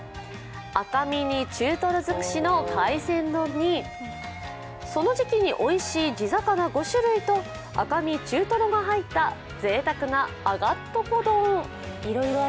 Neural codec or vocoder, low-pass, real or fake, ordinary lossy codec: none; none; real; none